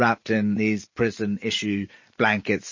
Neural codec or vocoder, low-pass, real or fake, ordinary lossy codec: none; 7.2 kHz; real; MP3, 32 kbps